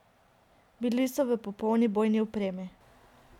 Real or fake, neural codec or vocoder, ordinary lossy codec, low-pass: real; none; none; 19.8 kHz